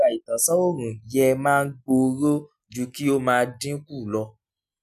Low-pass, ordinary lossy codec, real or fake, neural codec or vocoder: 14.4 kHz; none; real; none